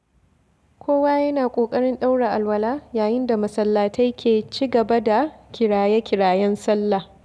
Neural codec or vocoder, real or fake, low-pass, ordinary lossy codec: none; real; none; none